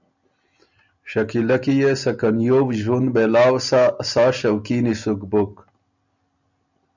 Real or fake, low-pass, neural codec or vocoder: real; 7.2 kHz; none